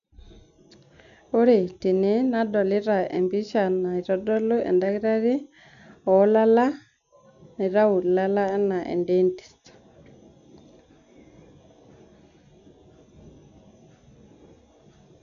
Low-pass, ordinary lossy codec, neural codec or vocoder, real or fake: 7.2 kHz; none; none; real